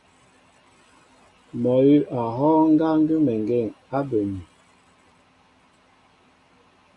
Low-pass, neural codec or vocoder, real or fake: 10.8 kHz; none; real